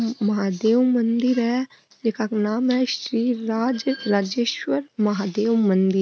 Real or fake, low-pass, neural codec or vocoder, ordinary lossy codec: real; none; none; none